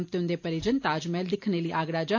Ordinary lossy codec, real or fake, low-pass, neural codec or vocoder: AAC, 48 kbps; real; 7.2 kHz; none